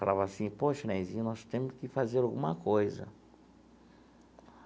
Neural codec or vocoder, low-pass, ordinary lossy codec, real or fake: none; none; none; real